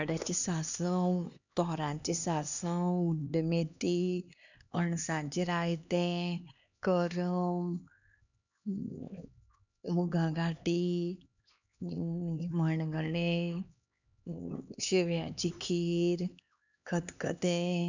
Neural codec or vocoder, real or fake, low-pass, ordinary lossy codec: codec, 16 kHz, 2 kbps, X-Codec, HuBERT features, trained on LibriSpeech; fake; 7.2 kHz; none